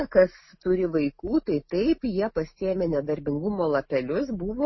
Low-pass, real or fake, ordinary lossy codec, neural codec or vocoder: 7.2 kHz; real; MP3, 24 kbps; none